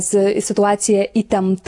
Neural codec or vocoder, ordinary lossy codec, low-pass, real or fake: none; AAC, 64 kbps; 10.8 kHz; real